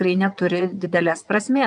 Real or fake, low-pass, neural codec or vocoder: fake; 9.9 kHz; vocoder, 22.05 kHz, 80 mel bands, WaveNeXt